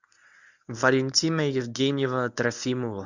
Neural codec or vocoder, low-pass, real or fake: codec, 24 kHz, 0.9 kbps, WavTokenizer, medium speech release version 1; 7.2 kHz; fake